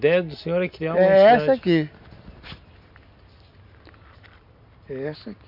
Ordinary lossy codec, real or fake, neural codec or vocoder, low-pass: none; real; none; 5.4 kHz